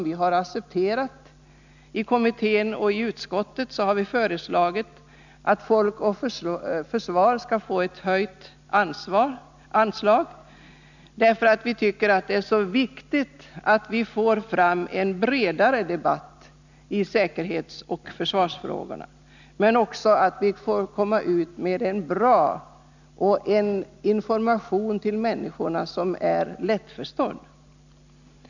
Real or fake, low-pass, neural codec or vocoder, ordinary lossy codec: real; 7.2 kHz; none; none